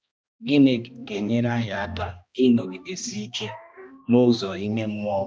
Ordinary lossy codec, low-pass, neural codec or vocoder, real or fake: none; none; codec, 16 kHz, 1 kbps, X-Codec, HuBERT features, trained on balanced general audio; fake